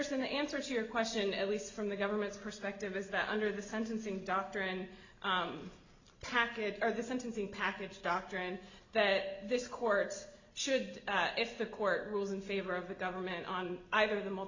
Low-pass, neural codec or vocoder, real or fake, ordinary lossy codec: 7.2 kHz; none; real; Opus, 64 kbps